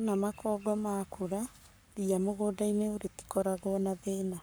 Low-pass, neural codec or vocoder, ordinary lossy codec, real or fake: none; codec, 44.1 kHz, 7.8 kbps, Pupu-Codec; none; fake